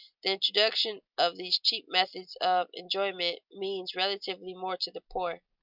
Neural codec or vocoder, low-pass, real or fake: none; 5.4 kHz; real